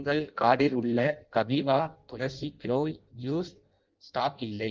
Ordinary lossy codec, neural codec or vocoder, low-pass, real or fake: Opus, 32 kbps; codec, 16 kHz in and 24 kHz out, 0.6 kbps, FireRedTTS-2 codec; 7.2 kHz; fake